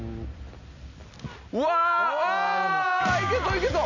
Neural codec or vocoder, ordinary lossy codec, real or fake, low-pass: none; none; real; 7.2 kHz